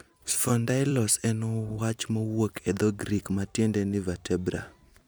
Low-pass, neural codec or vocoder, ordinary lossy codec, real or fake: none; vocoder, 44.1 kHz, 128 mel bands every 256 samples, BigVGAN v2; none; fake